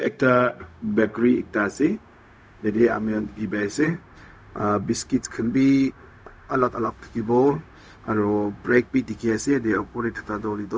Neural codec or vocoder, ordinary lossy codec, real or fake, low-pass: codec, 16 kHz, 0.4 kbps, LongCat-Audio-Codec; none; fake; none